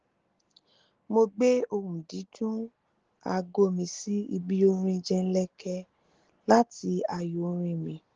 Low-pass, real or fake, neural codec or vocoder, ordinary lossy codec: 7.2 kHz; real; none; Opus, 16 kbps